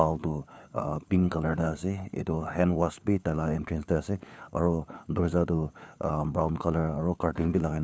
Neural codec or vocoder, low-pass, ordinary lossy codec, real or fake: codec, 16 kHz, 4 kbps, FunCodec, trained on LibriTTS, 50 frames a second; none; none; fake